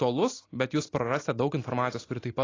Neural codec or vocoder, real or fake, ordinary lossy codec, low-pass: none; real; AAC, 32 kbps; 7.2 kHz